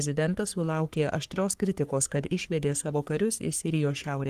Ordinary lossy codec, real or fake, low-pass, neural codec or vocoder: Opus, 24 kbps; fake; 14.4 kHz; codec, 44.1 kHz, 3.4 kbps, Pupu-Codec